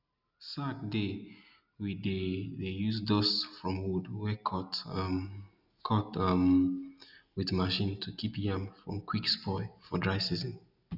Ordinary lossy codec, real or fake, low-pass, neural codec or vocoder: none; real; 5.4 kHz; none